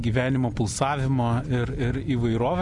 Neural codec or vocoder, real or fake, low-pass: none; real; 10.8 kHz